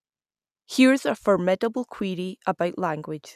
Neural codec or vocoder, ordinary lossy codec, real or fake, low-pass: none; none; real; 14.4 kHz